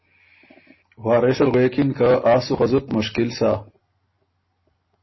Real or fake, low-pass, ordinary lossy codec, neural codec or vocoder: real; 7.2 kHz; MP3, 24 kbps; none